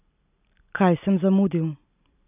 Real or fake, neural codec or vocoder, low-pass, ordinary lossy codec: real; none; 3.6 kHz; none